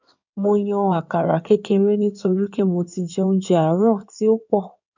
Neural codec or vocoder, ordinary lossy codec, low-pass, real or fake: codec, 16 kHz in and 24 kHz out, 2.2 kbps, FireRedTTS-2 codec; none; 7.2 kHz; fake